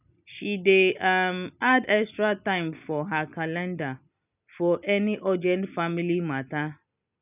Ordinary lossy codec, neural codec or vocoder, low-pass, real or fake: none; none; 3.6 kHz; real